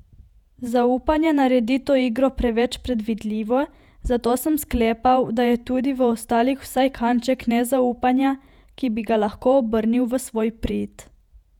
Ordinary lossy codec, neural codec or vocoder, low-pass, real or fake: none; vocoder, 48 kHz, 128 mel bands, Vocos; 19.8 kHz; fake